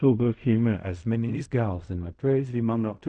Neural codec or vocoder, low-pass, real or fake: codec, 16 kHz in and 24 kHz out, 0.4 kbps, LongCat-Audio-Codec, fine tuned four codebook decoder; 10.8 kHz; fake